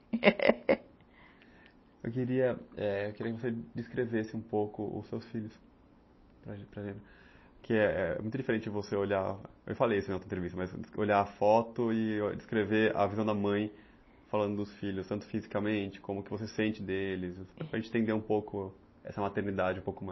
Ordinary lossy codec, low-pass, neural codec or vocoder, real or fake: MP3, 24 kbps; 7.2 kHz; none; real